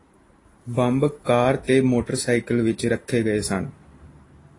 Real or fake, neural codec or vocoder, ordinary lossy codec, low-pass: real; none; AAC, 32 kbps; 10.8 kHz